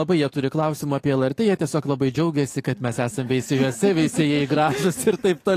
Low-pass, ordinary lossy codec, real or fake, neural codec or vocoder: 14.4 kHz; AAC, 48 kbps; fake; codec, 44.1 kHz, 7.8 kbps, DAC